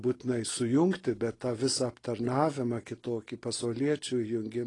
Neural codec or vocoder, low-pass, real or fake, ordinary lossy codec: none; 10.8 kHz; real; AAC, 32 kbps